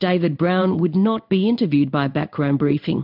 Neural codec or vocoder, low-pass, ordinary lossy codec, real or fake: codec, 16 kHz in and 24 kHz out, 1 kbps, XY-Tokenizer; 5.4 kHz; AAC, 48 kbps; fake